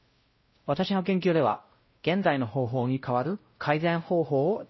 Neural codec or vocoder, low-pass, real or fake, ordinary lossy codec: codec, 16 kHz, 0.5 kbps, X-Codec, WavLM features, trained on Multilingual LibriSpeech; 7.2 kHz; fake; MP3, 24 kbps